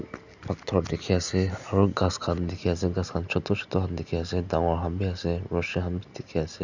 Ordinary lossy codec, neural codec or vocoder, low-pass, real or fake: none; none; 7.2 kHz; real